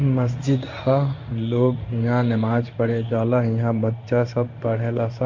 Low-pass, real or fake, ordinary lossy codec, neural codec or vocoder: 7.2 kHz; fake; MP3, 64 kbps; codec, 16 kHz in and 24 kHz out, 1 kbps, XY-Tokenizer